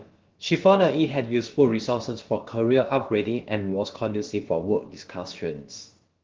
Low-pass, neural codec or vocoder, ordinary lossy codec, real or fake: 7.2 kHz; codec, 16 kHz, about 1 kbps, DyCAST, with the encoder's durations; Opus, 16 kbps; fake